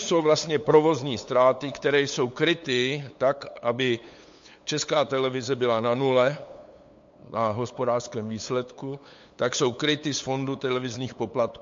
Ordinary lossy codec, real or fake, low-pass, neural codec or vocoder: MP3, 48 kbps; fake; 7.2 kHz; codec, 16 kHz, 8 kbps, FunCodec, trained on LibriTTS, 25 frames a second